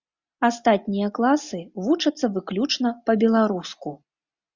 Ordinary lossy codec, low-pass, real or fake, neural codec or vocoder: Opus, 64 kbps; 7.2 kHz; real; none